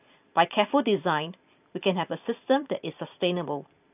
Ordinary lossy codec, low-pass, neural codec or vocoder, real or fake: AAC, 32 kbps; 3.6 kHz; vocoder, 44.1 kHz, 128 mel bands every 512 samples, BigVGAN v2; fake